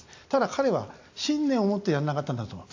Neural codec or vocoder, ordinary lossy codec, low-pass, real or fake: none; AAC, 48 kbps; 7.2 kHz; real